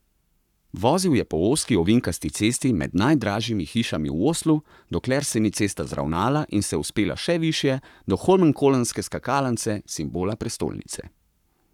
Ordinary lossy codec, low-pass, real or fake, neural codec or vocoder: none; 19.8 kHz; fake; codec, 44.1 kHz, 7.8 kbps, Pupu-Codec